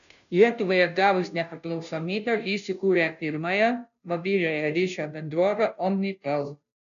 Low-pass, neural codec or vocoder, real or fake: 7.2 kHz; codec, 16 kHz, 0.5 kbps, FunCodec, trained on Chinese and English, 25 frames a second; fake